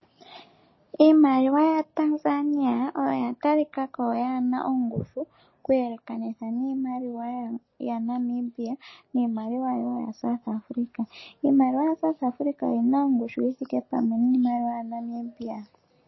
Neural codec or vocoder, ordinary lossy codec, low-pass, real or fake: none; MP3, 24 kbps; 7.2 kHz; real